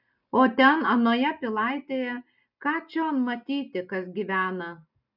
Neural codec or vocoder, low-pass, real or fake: none; 5.4 kHz; real